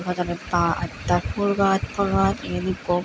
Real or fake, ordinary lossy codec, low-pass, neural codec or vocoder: real; none; none; none